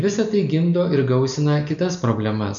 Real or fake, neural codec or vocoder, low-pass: real; none; 7.2 kHz